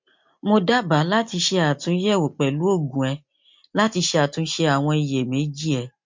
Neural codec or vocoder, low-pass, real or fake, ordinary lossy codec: none; 7.2 kHz; real; MP3, 48 kbps